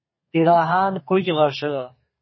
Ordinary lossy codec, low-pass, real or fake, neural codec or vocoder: MP3, 24 kbps; 7.2 kHz; fake; codec, 32 kHz, 1.9 kbps, SNAC